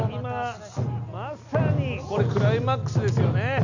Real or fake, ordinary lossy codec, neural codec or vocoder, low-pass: real; none; none; 7.2 kHz